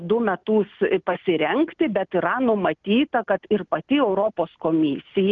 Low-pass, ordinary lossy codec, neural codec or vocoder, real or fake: 7.2 kHz; Opus, 24 kbps; none; real